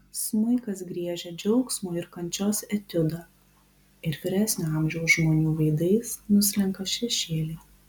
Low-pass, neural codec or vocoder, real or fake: 19.8 kHz; none; real